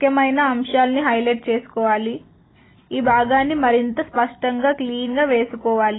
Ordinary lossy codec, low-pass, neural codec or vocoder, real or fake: AAC, 16 kbps; 7.2 kHz; none; real